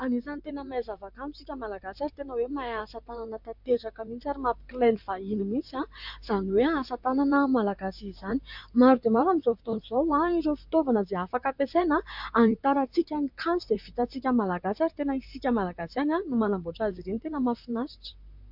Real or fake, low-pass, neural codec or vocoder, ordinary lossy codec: fake; 5.4 kHz; vocoder, 44.1 kHz, 128 mel bands, Pupu-Vocoder; MP3, 48 kbps